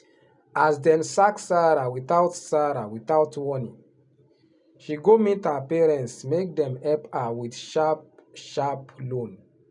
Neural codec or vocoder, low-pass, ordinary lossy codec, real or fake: none; 10.8 kHz; none; real